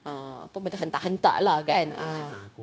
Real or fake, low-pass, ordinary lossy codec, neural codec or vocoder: fake; none; none; codec, 16 kHz, 0.9 kbps, LongCat-Audio-Codec